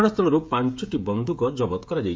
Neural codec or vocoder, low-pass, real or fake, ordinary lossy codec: codec, 16 kHz, 16 kbps, FreqCodec, smaller model; none; fake; none